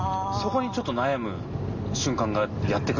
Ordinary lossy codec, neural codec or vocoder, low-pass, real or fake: none; none; 7.2 kHz; real